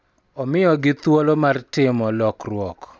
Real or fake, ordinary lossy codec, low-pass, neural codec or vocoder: real; none; none; none